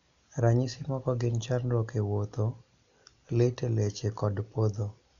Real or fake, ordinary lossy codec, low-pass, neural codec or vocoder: real; MP3, 96 kbps; 7.2 kHz; none